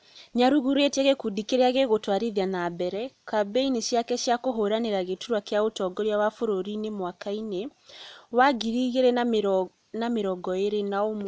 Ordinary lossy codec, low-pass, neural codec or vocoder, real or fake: none; none; none; real